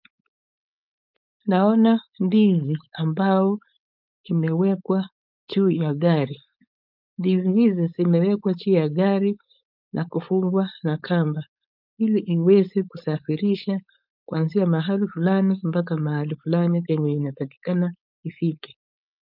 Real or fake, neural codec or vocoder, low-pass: fake; codec, 16 kHz, 4.8 kbps, FACodec; 5.4 kHz